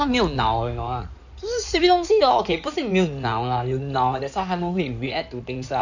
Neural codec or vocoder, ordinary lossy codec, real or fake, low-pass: codec, 16 kHz in and 24 kHz out, 2.2 kbps, FireRedTTS-2 codec; none; fake; 7.2 kHz